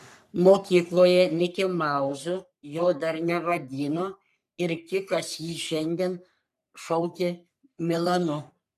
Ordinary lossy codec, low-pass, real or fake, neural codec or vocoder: AAC, 96 kbps; 14.4 kHz; fake; codec, 44.1 kHz, 3.4 kbps, Pupu-Codec